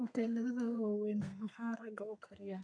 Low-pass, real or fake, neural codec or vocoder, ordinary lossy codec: 9.9 kHz; fake; codec, 32 kHz, 1.9 kbps, SNAC; none